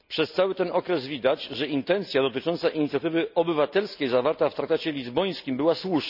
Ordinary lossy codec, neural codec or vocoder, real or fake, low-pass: none; none; real; 5.4 kHz